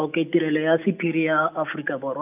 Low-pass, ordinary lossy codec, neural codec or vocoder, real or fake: 3.6 kHz; none; none; real